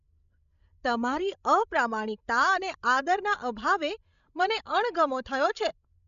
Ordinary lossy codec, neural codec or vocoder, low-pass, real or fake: MP3, 96 kbps; codec, 16 kHz, 16 kbps, FreqCodec, larger model; 7.2 kHz; fake